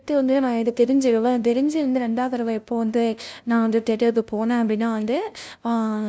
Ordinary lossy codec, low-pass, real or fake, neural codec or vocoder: none; none; fake; codec, 16 kHz, 0.5 kbps, FunCodec, trained on LibriTTS, 25 frames a second